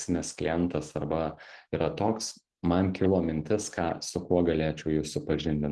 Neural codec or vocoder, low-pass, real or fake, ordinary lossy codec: none; 10.8 kHz; real; Opus, 16 kbps